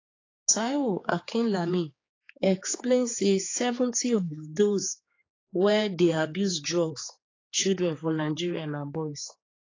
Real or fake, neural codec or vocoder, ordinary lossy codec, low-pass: fake; codec, 16 kHz, 4 kbps, X-Codec, HuBERT features, trained on general audio; AAC, 32 kbps; 7.2 kHz